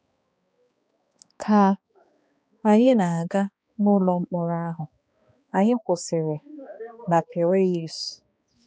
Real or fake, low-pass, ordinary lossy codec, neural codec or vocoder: fake; none; none; codec, 16 kHz, 2 kbps, X-Codec, HuBERT features, trained on balanced general audio